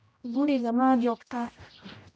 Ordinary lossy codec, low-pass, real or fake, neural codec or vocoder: none; none; fake; codec, 16 kHz, 0.5 kbps, X-Codec, HuBERT features, trained on general audio